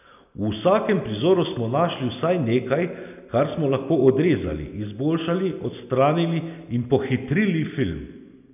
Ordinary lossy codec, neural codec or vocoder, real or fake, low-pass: none; none; real; 3.6 kHz